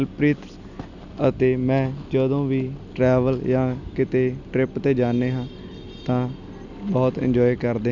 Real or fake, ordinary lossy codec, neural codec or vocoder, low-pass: real; none; none; 7.2 kHz